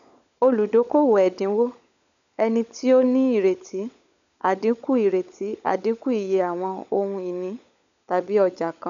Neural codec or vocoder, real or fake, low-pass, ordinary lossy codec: codec, 16 kHz, 16 kbps, FunCodec, trained on LibriTTS, 50 frames a second; fake; 7.2 kHz; none